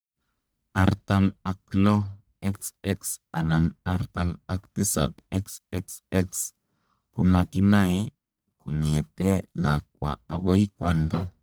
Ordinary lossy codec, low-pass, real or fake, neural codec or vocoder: none; none; fake; codec, 44.1 kHz, 1.7 kbps, Pupu-Codec